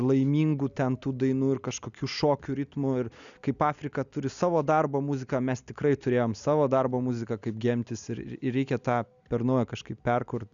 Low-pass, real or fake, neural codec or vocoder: 7.2 kHz; real; none